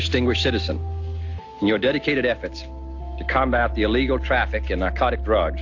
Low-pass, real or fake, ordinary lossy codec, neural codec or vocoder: 7.2 kHz; real; AAC, 48 kbps; none